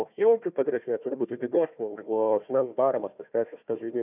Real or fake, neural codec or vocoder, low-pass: fake; codec, 16 kHz, 1 kbps, FunCodec, trained on Chinese and English, 50 frames a second; 3.6 kHz